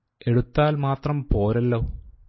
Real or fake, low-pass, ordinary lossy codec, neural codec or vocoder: real; 7.2 kHz; MP3, 24 kbps; none